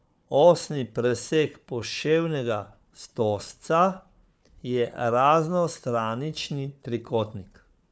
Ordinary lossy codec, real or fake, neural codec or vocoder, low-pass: none; fake; codec, 16 kHz, 4 kbps, FunCodec, trained on Chinese and English, 50 frames a second; none